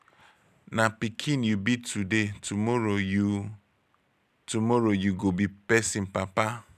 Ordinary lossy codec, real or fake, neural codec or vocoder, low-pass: none; real; none; 14.4 kHz